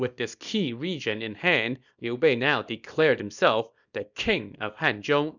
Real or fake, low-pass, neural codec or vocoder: fake; 7.2 kHz; codec, 24 kHz, 0.9 kbps, WavTokenizer, small release